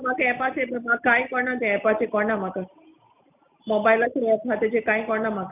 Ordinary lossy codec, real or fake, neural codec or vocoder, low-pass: none; real; none; 3.6 kHz